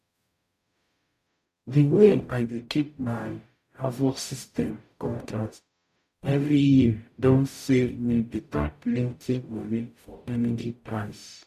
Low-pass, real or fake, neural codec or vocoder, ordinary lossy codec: 14.4 kHz; fake; codec, 44.1 kHz, 0.9 kbps, DAC; none